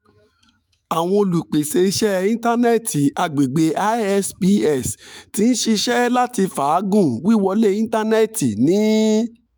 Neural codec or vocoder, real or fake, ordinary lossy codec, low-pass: autoencoder, 48 kHz, 128 numbers a frame, DAC-VAE, trained on Japanese speech; fake; none; none